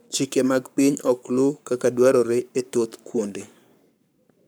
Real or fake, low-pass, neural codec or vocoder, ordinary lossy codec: fake; none; codec, 44.1 kHz, 7.8 kbps, Pupu-Codec; none